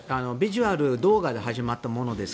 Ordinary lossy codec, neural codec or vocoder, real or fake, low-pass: none; none; real; none